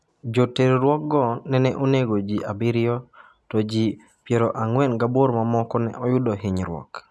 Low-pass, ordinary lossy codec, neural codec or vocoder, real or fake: none; none; none; real